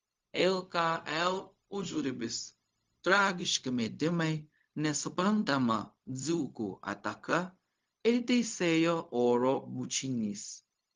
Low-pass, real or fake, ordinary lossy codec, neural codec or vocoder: 7.2 kHz; fake; Opus, 32 kbps; codec, 16 kHz, 0.4 kbps, LongCat-Audio-Codec